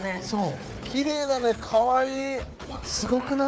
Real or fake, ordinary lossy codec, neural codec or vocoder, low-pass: fake; none; codec, 16 kHz, 4 kbps, FunCodec, trained on Chinese and English, 50 frames a second; none